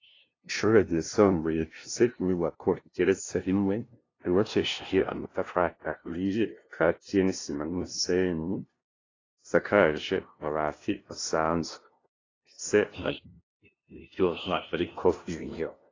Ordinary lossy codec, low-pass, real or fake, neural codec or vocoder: AAC, 32 kbps; 7.2 kHz; fake; codec, 16 kHz, 0.5 kbps, FunCodec, trained on LibriTTS, 25 frames a second